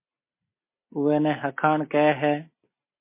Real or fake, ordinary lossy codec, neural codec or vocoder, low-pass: real; MP3, 24 kbps; none; 3.6 kHz